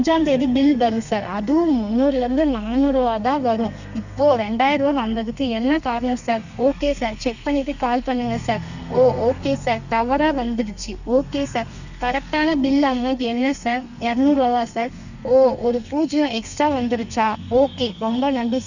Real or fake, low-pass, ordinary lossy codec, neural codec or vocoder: fake; 7.2 kHz; none; codec, 32 kHz, 1.9 kbps, SNAC